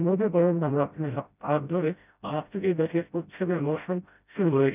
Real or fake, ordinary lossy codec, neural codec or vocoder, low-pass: fake; none; codec, 16 kHz, 0.5 kbps, FreqCodec, smaller model; 3.6 kHz